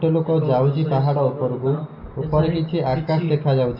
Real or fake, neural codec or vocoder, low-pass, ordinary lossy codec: real; none; 5.4 kHz; AAC, 48 kbps